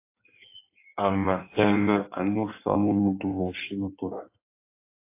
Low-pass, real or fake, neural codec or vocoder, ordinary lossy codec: 3.6 kHz; fake; codec, 16 kHz in and 24 kHz out, 1.1 kbps, FireRedTTS-2 codec; AAC, 24 kbps